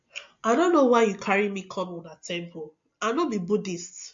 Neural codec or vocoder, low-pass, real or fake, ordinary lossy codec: none; 7.2 kHz; real; MP3, 48 kbps